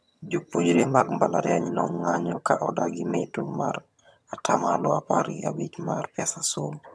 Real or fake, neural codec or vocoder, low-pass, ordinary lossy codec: fake; vocoder, 22.05 kHz, 80 mel bands, HiFi-GAN; none; none